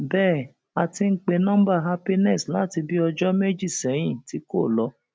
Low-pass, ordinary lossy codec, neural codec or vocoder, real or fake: none; none; none; real